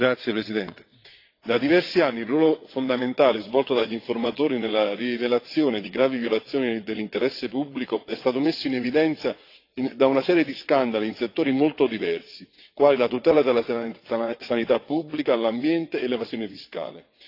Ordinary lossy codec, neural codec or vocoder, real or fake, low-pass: AAC, 32 kbps; vocoder, 22.05 kHz, 80 mel bands, WaveNeXt; fake; 5.4 kHz